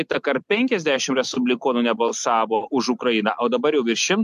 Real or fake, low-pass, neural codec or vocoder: real; 14.4 kHz; none